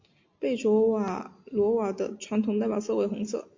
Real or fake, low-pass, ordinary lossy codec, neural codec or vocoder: real; 7.2 kHz; MP3, 48 kbps; none